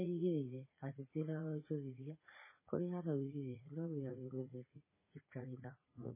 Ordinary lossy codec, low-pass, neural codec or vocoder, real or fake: MP3, 16 kbps; 3.6 kHz; vocoder, 44.1 kHz, 80 mel bands, Vocos; fake